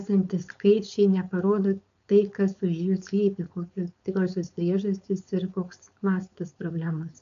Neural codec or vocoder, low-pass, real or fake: codec, 16 kHz, 4.8 kbps, FACodec; 7.2 kHz; fake